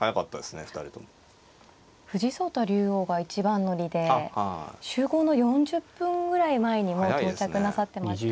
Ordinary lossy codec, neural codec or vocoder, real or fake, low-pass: none; none; real; none